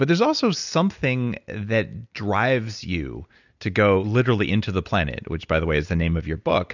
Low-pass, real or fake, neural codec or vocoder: 7.2 kHz; real; none